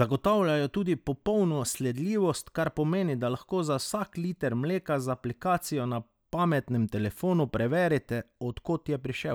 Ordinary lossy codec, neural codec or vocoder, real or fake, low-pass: none; none; real; none